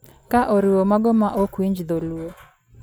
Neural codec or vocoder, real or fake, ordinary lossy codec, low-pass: none; real; none; none